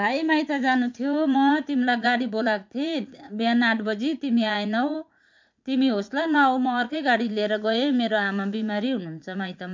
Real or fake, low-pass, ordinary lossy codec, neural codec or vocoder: fake; 7.2 kHz; MP3, 64 kbps; vocoder, 44.1 kHz, 80 mel bands, Vocos